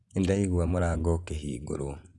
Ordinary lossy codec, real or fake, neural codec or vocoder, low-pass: Opus, 64 kbps; fake; vocoder, 24 kHz, 100 mel bands, Vocos; 10.8 kHz